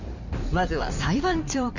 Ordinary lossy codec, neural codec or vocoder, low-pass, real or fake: none; codec, 16 kHz in and 24 kHz out, 2.2 kbps, FireRedTTS-2 codec; 7.2 kHz; fake